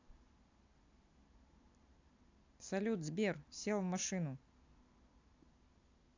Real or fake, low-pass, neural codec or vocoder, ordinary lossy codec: real; 7.2 kHz; none; AAC, 48 kbps